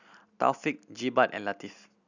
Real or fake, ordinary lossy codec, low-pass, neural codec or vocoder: real; none; 7.2 kHz; none